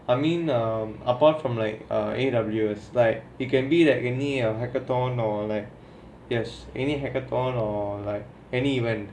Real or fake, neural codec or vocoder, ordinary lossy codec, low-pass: real; none; none; none